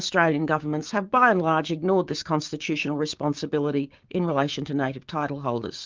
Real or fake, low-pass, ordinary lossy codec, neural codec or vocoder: fake; 7.2 kHz; Opus, 24 kbps; codec, 16 kHz, 6 kbps, DAC